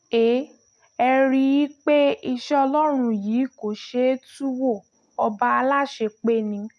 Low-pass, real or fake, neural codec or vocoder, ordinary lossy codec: none; real; none; none